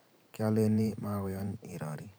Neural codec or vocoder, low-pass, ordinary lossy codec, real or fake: vocoder, 44.1 kHz, 128 mel bands every 512 samples, BigVGAN v2; none; none; fake